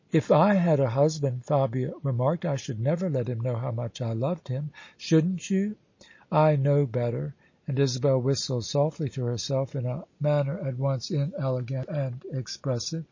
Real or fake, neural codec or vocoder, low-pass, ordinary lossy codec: real; none; 7.2 kHz; MP3, 32 kbps